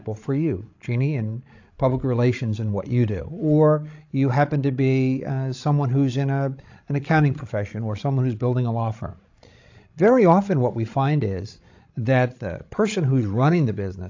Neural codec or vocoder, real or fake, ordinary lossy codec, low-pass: codec, 16 kHz, 16 kbps, FreqCodec, larger model; fake; AAC, 48 kbps; 7.2 kHz